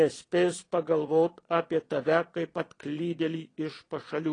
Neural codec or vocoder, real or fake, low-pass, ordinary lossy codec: vocoder, 22.05 kHz, 80 mel bands, Vocos; fake; 9.9 kHz; AAC, 32 kbps